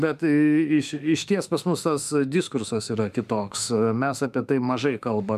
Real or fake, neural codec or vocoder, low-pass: fake; autoencoder, 48 kHz, 32 numbers a frame, DAC-VAE, trained on Japanese speech; 14.4 kHz